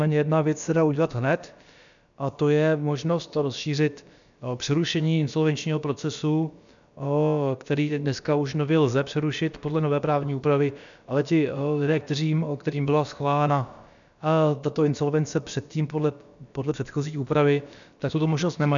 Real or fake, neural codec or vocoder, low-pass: fake; codec, 16 kHz, about 1 kbps, DyCAST, with the encoder's durations; 7.2 kHz